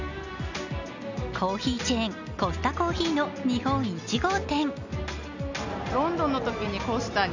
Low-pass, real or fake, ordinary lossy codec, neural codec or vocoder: 7.2 kHz; real; none; none